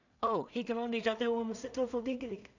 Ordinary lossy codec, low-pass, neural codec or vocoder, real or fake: none; 7.2 kHz; codec, 16 kHz in and 24 kHz out, 0.4 kbps, LongCat-Audio-Codec, two codebook decoder; fake